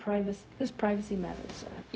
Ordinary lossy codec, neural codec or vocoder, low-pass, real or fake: none; codec, 16 kHz, 0.4 kbps, LongCat-Audio-Codec; none; fake